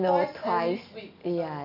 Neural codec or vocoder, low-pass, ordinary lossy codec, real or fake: none; 5.4 kHz; none; real